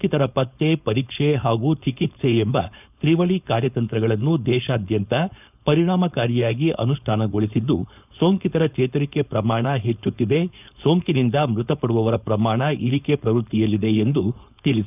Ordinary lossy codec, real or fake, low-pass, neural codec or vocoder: none; fake; 3.6 kHz; codec, 16 kHz, 4.8 kbps, FACodec